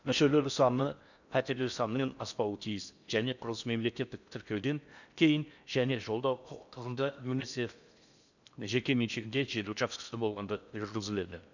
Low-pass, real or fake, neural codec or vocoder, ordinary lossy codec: 7.2 kHz; fake; codec, 16 kHz in and 24 kHz out, 0.6 kbps, FocalCodec, streaming, 4096 codes; none